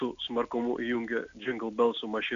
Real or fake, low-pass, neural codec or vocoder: real; 7.2 kHz; none